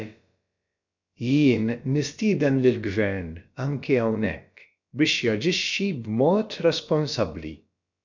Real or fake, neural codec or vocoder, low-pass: fake; codec, 16 kHz, about 1 kbps, DyCAST, with the encoder's durations; 7.2 kHz